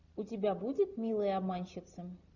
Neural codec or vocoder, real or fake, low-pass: none; real; 7.2 kHz